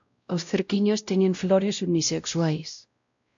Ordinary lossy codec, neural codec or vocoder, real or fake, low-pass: AAC, 64 kbps; codec, 16 kHz, 0.5 kbps, X-Codec, WavLM features, trained on Multilingual LibriSpeech; fake; 7.2 kHz